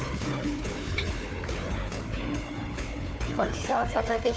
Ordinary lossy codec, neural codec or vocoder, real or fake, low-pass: none; codec, 16 kHz, 4 kbps, FunCodec, trained on Chinese and English, 50 frames a second; fake; none